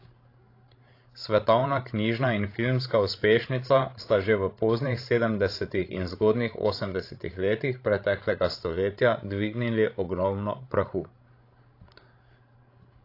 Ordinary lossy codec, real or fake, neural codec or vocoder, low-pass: AAC, 32 kbps; fake; codec, 16 kHz, 16 kbps, FreqCodec, larger model; 5.4 kHz